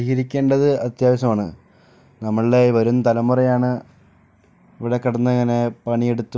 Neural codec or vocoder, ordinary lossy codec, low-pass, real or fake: none; none; none; real